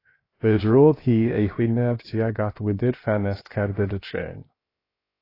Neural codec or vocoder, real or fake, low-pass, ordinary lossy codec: codec, 16 kHz, 0.7 kbps, FocalCodec; fake; 5.4 kHz; AAC, 24 kbps